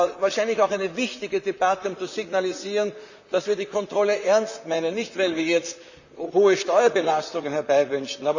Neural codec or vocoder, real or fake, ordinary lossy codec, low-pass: vocoder, 44.1 kHz, 128 mel bands, Pupu-Vocoder; fake; none; 7.2 kHz